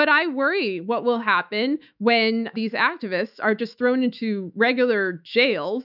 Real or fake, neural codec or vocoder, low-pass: fake; autoencoder, 48 kHz, 128 numbers a frame, DAC-VAE, trained on Japanese speech; 5.4 kHz